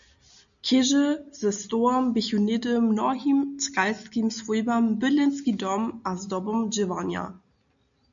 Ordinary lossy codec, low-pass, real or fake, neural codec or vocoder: MP3, 64 kbps; 7.2 kHz; real; none